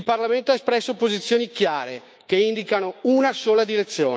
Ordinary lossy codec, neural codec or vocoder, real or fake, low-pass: none; codec, 16 kHz, 6 kbps, DAC; fake; none